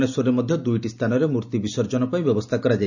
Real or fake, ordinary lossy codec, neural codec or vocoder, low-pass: real; none; none; 7.2 kHz